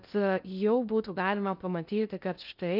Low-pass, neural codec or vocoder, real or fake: 5.4 kHz; codec, 16 kHz in and 24 kHz out, 0.6 kbps, FocalCodec, streaming, 2048 codes; fake